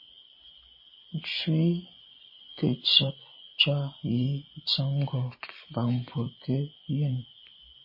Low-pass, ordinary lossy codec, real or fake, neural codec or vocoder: 5.4 kHz; MP3, 24 kbps; fake; vocoder, 24 kHz, 100 mel bands, Vocos